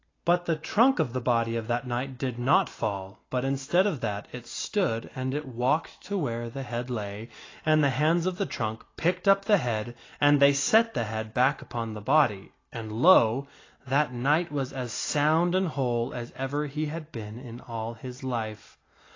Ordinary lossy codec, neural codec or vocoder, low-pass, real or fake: AAC, 32 kbps; none; 7.2 kHz; real